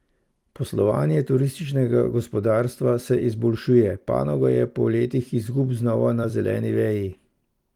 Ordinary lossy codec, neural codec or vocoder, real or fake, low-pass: Opus, 24 kbps; vocoder, 44.1 kHz, 128 mel bands every 256 samples, BigVGAN v2; fake; 19.8 kHz